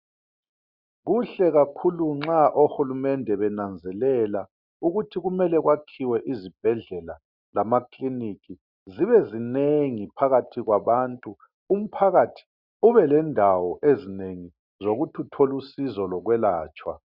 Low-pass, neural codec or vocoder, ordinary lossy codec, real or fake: 5.4 kHz; none; Opus, 64 kbps; real